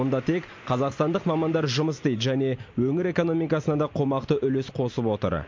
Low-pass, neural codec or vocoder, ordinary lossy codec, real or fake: 7.2 kHz; none; MP3, 48 kbps; real